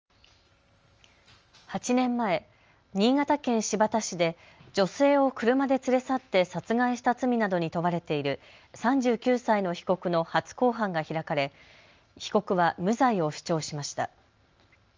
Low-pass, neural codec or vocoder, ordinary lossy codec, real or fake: 7.2 kHz; none; Opus, 24 kbps; real